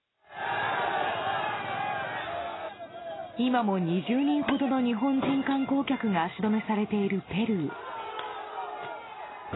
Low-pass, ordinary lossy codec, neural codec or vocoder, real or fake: 7.2 kHz; AAC, 16 kbps; none; real